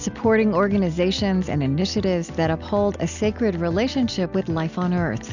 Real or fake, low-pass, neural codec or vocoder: real; 7.2 kHz; none